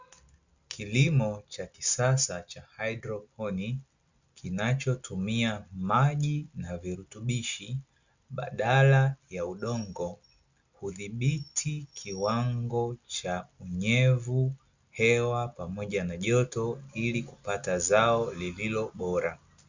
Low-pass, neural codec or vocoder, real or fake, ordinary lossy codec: 7.2 kHz; none; real; Opus, 64 kbps